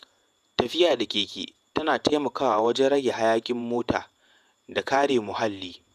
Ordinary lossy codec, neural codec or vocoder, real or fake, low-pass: none; vocoder, 48 kHz, 128 mel bands, Vocos; fake; 14.4 kHz